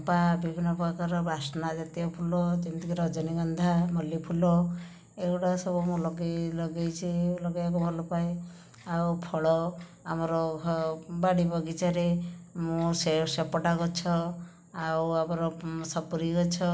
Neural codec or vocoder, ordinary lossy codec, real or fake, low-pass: none; none; real; none